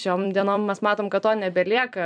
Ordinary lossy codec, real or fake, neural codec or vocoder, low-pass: MP3, 96 kbps; fake; vocoder, 44.1 kHz, 128 mel bands every 256 samples, BigVGAN v2; 9.9 kHz